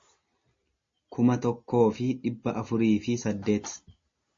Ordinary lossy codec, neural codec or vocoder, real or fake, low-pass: MP3, 32 kbps; none; real; 7.2 kHz